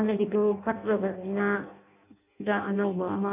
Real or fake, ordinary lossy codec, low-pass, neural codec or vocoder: fake; none; 3.6 kHz; codec, 16 kHz in and 24 kHz out, 0.6 kbps, FireRedTTS-2 codec